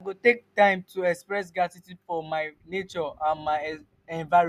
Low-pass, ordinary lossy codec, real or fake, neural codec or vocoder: 14.4 kHz; none; real; none